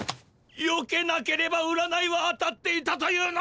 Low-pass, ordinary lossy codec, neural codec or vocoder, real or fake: none; none; none; real